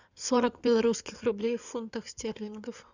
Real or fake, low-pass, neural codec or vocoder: fake; 7.2 kHz; codec, 16 kHz in and 24 kHz out, 2.2 kbps, FireRedTTS-2 codec